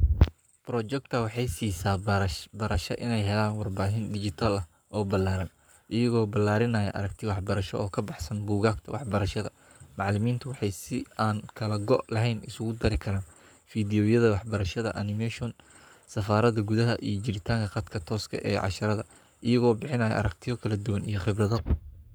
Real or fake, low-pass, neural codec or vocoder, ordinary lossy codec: fake; none; codec, 44.1 kHz, 7.8 kbps, Pupu-Codec; none